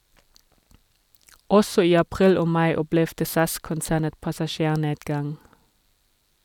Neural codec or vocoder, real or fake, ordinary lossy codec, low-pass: none; real; none; 19.8 kHz